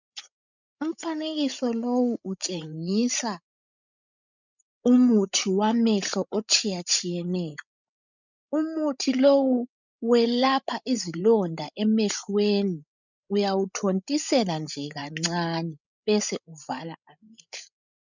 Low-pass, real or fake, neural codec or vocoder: 7.2 kHz; fake; codec, 16 kHz, 16 kbps, FreqCodec, larger model